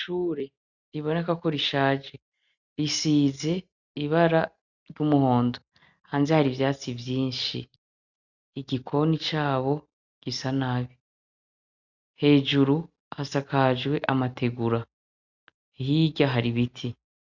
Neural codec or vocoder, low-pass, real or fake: none; 7.2 kHz; real